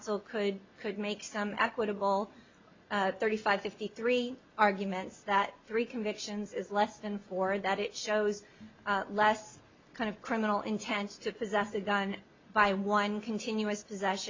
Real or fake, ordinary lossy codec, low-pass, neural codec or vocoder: real; MP3, 48 kbps; 7.2 kHz; none